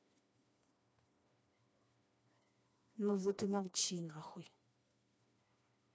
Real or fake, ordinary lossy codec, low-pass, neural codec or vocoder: fake; none; none; codec, 16 kHz, 2 kbps, FreqCodec, smaller model